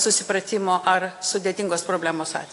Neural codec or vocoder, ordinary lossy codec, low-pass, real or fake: none; AAC, 48 kbps; 10.8 kHz; real